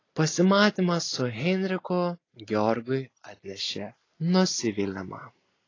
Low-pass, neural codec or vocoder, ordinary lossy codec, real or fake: 7.2 kHz; none; AAC, 32 kbps; real